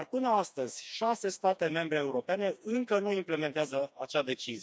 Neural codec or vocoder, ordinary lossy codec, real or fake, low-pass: codec, 16 kHz, 2 kbps, FreqCodec, smaller model; none; fake; none